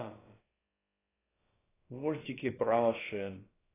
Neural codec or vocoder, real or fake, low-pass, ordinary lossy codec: codec, 16 kHz, about 1 kbps, DyCAST, with the encoder's durations; fake; 3.6 kHz; AAC, 16 kbps